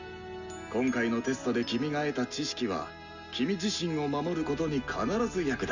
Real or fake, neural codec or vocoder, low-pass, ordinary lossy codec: real; none; 7.2 kHz; none